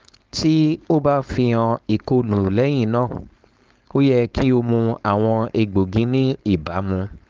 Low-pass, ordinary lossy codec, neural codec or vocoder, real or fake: 7.2 kHz; Opus, 32 kbps; codec, 16 kHz, 4.8 kbps, FACodec; fake